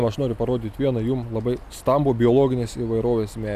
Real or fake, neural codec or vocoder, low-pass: real; none; 14.4 kHz